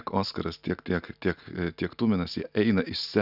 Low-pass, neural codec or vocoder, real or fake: 5.4 kHz; none; real